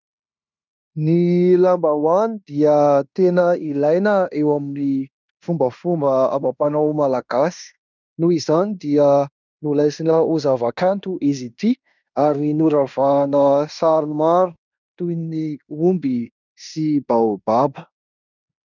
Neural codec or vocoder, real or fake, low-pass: codec, 16 kHz in and 24 kHz out, 0.9 kbps, LongCat-Audio-Codec, fine tuned four codebook decoder; fake; 7.2 kHz